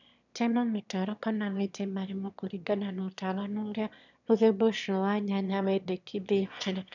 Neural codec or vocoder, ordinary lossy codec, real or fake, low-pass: autoencoder, 22.05 kHz, a latent of 192 numbers a frame, VITS, trained on one speaker; none; fake; 7.2 kHz